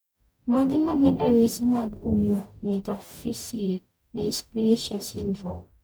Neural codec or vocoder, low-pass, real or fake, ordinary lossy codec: codec, 44.1 kHz, 0.9 kbps, DAC; none; fake; none